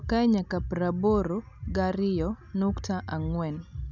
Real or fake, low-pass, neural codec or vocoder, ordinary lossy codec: real; 7.2 kHz; none; none